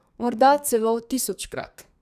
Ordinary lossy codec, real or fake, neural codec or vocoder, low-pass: none; fake; codec, 32 kHz, 1.9 kbps, SNAC; 14.4 kHz